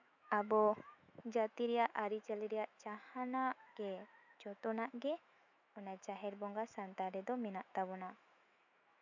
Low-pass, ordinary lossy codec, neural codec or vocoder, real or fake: 7.2 kHz; none; autoencoder, 48 kHz, 128 numbers a frame, DAC-VAE, trained on Japanese speech; fake